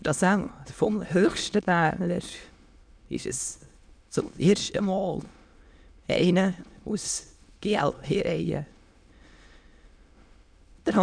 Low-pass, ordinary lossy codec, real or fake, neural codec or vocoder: 9.9 kHz; none; fake; autoencoder, 22.05 kHz, a latent of 192 numbers a frame, VITS, trained on many speakers